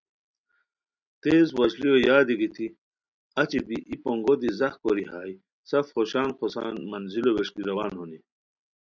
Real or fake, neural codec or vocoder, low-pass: real; none; 7.2 kHz